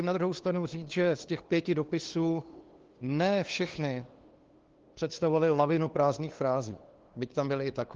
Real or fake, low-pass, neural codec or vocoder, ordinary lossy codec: fake; 7.2 kHz; codec, 16 kHz, 2 kbps, FunCodec, trained on LibriTTS, 25 frames a second; Opus, 16 kbps